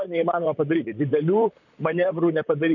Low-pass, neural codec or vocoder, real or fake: 7.2 kHz; vocoder, 44.1 kHz, 128 mel bands, Pupu-Vocoder; fake